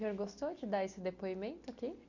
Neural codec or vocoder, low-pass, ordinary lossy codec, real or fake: none; 7.2 kHz; none; real